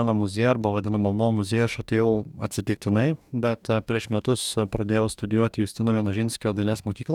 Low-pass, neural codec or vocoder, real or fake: 19.8 kHz; codec, 44.1 kHz, 2.6 kbps, DAC; fake